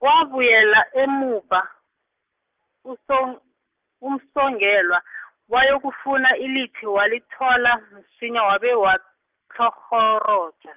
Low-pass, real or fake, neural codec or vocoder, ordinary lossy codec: 3.6 kHz; real; none; Opus, 24 kbps